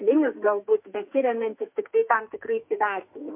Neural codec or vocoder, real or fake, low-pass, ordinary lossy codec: codec, 44.1 kHz, 2.6 kbps, SNAC; fake; 3.6 kHz; MP3, 32 kbps